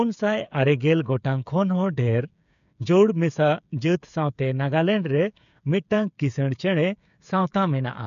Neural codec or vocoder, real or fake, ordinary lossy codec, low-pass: codec, 16 kHz, 8 kbps, FreqCodec, smaller model; fake; none; 7.2 kHz